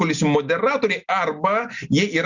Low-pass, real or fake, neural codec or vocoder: 7.2 kHz; real; none